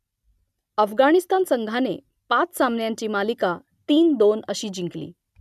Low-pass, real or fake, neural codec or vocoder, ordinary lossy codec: 14.4 kHz; real; none; none